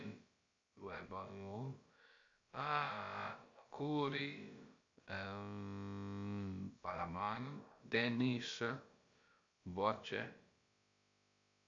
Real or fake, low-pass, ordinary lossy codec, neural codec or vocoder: fake; 7.2 kHz; MP3, 48 kbps; codec, 16 kHz, about 1 kbps, DyCAST, with the encoder's durations